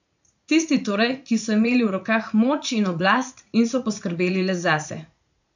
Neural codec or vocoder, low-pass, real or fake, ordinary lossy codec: vocoder, 44.1 kHz, 80 mel bands, Vocos; 7.2 kHz; fake; none